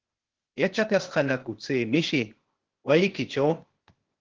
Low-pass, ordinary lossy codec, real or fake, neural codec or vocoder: 7.2 kHz; Opus, 16 kbps; fake; codec, 16 kHz, 0.8 kbps, ZipCodec